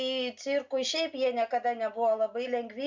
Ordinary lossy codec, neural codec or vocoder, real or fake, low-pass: MP3, 64 kbps; none; real; 7.2 kHz